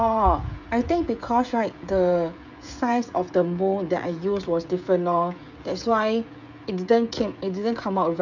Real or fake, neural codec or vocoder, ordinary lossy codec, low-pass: fake; codec, 16 kHz, 16 kbps, FreqCodec, smaller model; none; 7.2 kHz